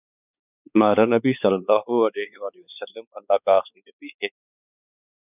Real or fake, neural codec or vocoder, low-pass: fake; codec, 16 kHz, 4 kbps, X-Codec, WavLM features, trained on Multilingual LibriSpeech; 3.6 kHz